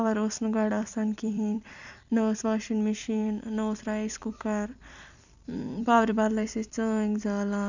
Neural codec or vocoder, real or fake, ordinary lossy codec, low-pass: vocoder, 44.1 kHz, 128 mel bands every 256 samples, BigVGAN v2; fake; none; 7.2 kHz